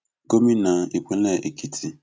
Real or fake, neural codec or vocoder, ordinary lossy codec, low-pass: real; none; none; none